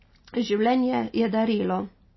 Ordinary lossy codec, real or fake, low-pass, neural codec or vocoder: MP3, 24 kbps; real; 7.2 kHz; none